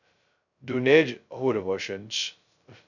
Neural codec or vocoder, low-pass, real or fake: codec, 16 kHz, 0.2 kbps, FocalCodec; 7.2 kHz; fake